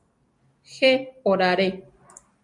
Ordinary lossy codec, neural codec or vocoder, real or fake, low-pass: MP3, 64 kbps; none; real; 10.8 kHz